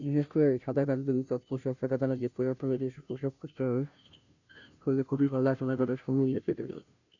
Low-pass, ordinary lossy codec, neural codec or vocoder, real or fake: 7.2 kHz; none; codec, 16 kHz, 0.5 kbps, FunCodec, trained on Chinese and English, 25 frames a second; fake